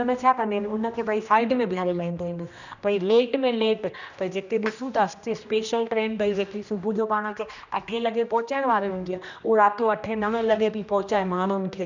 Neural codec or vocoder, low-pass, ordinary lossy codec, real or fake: codec, 16 kHz, 1 kbps, X-Codec, HuBERT features, trained on general audio; 7.2 kHz; none; fake